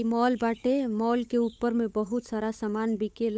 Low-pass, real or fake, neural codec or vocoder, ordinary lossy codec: none; fake; codec, 16 kHz, 8 kbps, FunCodec, trained on Chinese and English, 25 frames a second; none